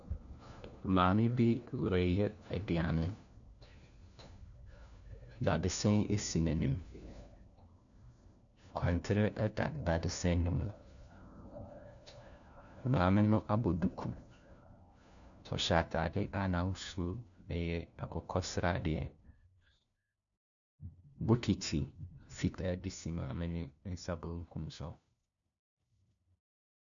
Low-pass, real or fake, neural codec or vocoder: 7.2 kHz; fake; codec, 16 kHz, 1 kbps, FunCodec, trained on LibriTTS, 50 frames a second